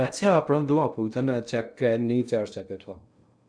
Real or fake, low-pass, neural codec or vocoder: fake; 9.9 kHz; codec, 16 kHz in and 24 kHz out, 0.6 kbps, FocalCodec, streaming, 2048 codes